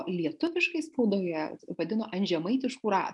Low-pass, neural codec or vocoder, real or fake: 10.8 kHz; none; real